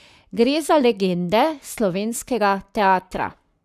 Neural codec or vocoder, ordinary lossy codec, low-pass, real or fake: codec, 44.1 kHz, 7.8 kbps, Pupu-Codec; none; 14.4 kHz; fake